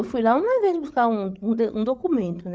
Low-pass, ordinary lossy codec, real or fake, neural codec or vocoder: none; none; fake; codec, 16 kHz, 8 kbps, FreqCodec, larger model